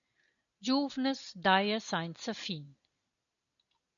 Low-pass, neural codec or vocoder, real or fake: 7.2 kHz; none; real